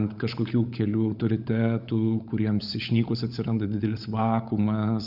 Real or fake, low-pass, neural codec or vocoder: fake; 5.4 kHz; codec, 16 kHz, 16 kbps, FunCodec, trained on LibriTTS, 50 frames a second